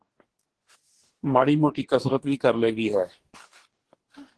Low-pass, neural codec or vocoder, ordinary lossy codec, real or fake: 10.8 kHz; codec, 44.1 kHz, 2.6 kbps, DAC; Opus, 16 kbps; fake